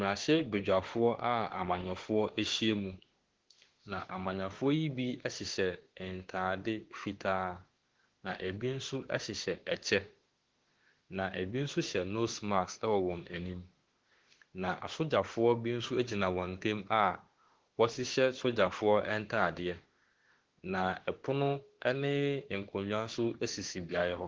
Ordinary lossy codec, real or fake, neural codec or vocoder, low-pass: Opus, 16 kbps; fake; autoencoder, 48 kHz, 32 numbers a frame, DAC-VAE, trained on Japanese speech; 7.2 kHz